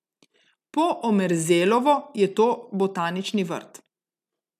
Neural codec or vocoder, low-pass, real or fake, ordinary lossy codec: none; 14.4 kHz; real; none